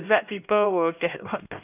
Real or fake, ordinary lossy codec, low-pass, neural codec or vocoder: fake; none; 3.6 kHz; codec, 16 kHz, 1 kbps, X-Codec, HuBERT features, trained on LibriSpeech